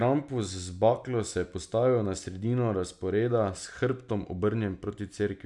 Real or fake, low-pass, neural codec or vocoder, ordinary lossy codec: real; 10.8 kHz; none; none